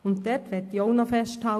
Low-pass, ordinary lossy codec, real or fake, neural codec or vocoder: 14.4 kHz; AAC, 64 kbps; real; none